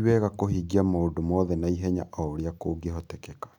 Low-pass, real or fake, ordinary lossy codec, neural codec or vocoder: 19.8 kHz; real; none; none